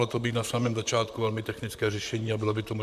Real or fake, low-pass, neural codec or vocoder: fake; 14.4 kHz; vocoder, 44.1 kHz, 128 mel bands, Pupu-Vocoder